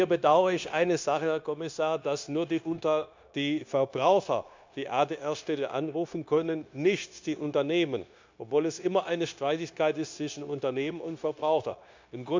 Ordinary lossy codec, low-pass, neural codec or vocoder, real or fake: MP3, 64 kbps; 7.2 kHz; codec, 16 kHz, 0.9 kbps, LongCat-Audio-Codec; fake